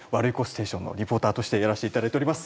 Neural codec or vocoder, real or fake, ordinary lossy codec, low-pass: none; real; none; none